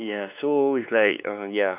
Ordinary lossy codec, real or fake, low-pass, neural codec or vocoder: none; fake; 3.6 kHz; codec, 16 kHz, 4 kbps, X-Codec, WavLM features, trained on Multilingual LibriSpeech